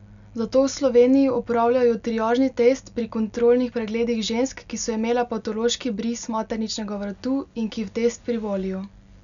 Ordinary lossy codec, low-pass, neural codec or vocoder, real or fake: none; 7.2 kHz; none; real